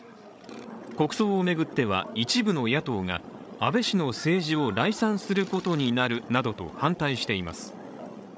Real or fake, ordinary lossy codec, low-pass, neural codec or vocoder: fake; none; none; codec, 16 kHz, 16 kbps, FreqCodec, larger model